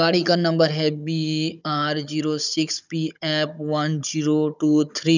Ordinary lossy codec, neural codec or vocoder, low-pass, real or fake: none; codec, 16 kHz, 16 kbps, FunCodec, trained on Chinese and English, 50 frames a second; 7.2 kHz; fake